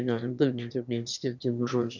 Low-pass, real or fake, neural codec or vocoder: 7.2 kHz; fake; autoencoder, 22.05 kHz, a latent of 192 numbers a frame, VITS, trained on one speaker